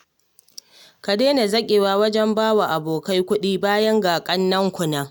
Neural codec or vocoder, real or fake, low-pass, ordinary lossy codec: none; real; 19.8 kHz; none